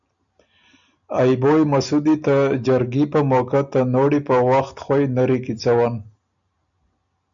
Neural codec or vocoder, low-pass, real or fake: none; 7.2 kHz; real